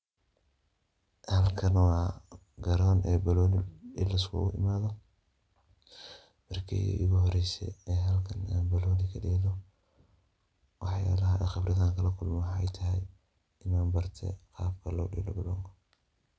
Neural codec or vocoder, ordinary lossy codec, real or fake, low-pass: none; none; real; none